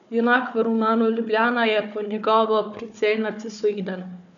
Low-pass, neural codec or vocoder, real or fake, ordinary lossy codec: 7.2 kHz; codec, 16 kHz, 4 kbps, FunCodec, trained on Chinese and English, 50 frames a second; fake; none